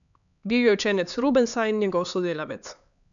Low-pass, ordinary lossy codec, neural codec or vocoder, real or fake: 7.2 kHz; none; codec, 16 kHz, 4 kbps, X-Codec, HuBERT features, trained on LibriSpeech; fake